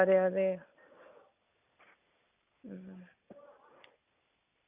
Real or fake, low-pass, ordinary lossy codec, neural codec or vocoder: real; 3.6 kHz; none; none